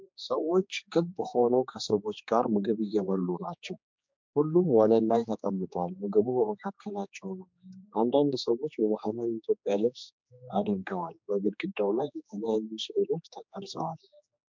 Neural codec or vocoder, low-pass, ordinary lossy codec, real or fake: codec, 16 kHz, 2 kbps, X-Codec, HuBERT features, trained on general audio; 7.2 kHz; MP3, 64 kbps; fake